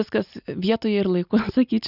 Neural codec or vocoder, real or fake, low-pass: none; real; 5.4 kHz